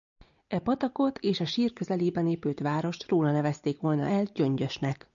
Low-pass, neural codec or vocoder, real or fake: 7.2 kHz; none; real